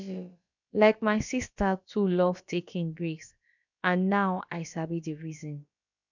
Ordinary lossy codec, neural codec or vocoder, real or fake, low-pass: none; codec, 16 kHz, about 1 kbps, DyCAST, with the encoder's durations; fake; 7.2 kHz